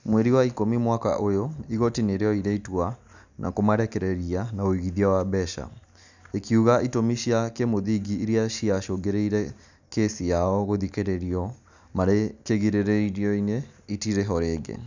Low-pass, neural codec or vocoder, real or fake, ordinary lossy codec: 7.2 kHz; none; real; none